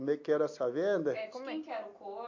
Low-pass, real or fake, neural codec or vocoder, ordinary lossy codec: 7.2 kHz; real; none; AAC, 48 kbps